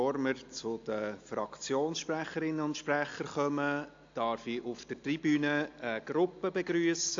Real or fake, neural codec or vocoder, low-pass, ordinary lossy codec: real; none; 7.2 kHz; AAC, 48 kbps